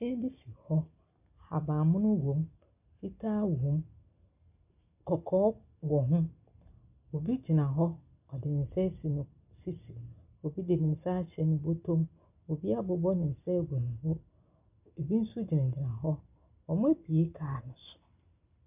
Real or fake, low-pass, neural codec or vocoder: real; 3.6 kHz; none